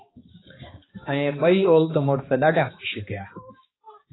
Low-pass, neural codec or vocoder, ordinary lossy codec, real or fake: 7.2 kHz; codec, 16 kHz, 2 kbps, X-Codec, HuBERT features, trained on balanced general audio; AAC, 16 kbps; fake